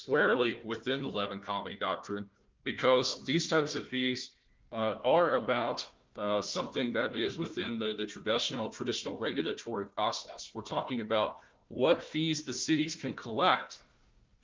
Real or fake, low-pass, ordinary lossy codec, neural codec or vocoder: fake; 7.2 kHz; Opus, 24 kbps; codec, 16 kHz, 1 kbps, FunCodec, trained on Chinese and English, 50 frames a second